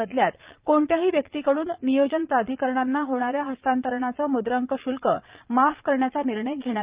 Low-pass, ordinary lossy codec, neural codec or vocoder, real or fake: 3.6 kHz; Opus, 32 kbps; vocoder, 22.05 kHz, 80 mel bands, Vocos; fake